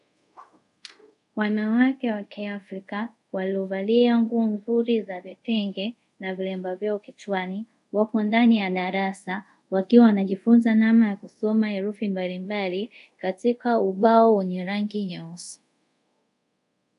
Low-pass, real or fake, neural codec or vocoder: 10.8 kHz; fake; codec, 24 kHz, 0.5 kbps, DualCodec